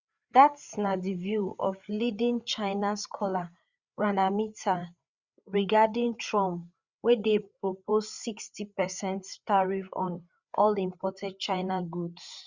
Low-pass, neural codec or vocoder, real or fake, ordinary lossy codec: 7.2 kHz; codec, 16 kHz, 8 kbps, FreqCodec, larger model; fake; Opus, 64 kbps